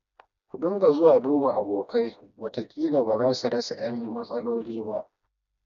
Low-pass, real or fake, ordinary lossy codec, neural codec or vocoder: 7.2 kHz; fake; none; codec, 16 kHz, 1 kbps, FreqCodec, smaller model